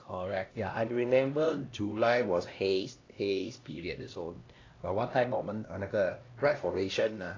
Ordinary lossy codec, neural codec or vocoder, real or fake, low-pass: AAC, 32 kbps; codec, 16 kHz, 1 kbps, X-Codec, HuBERT features, trained on LibriSpeech; fake; 7.2 kHz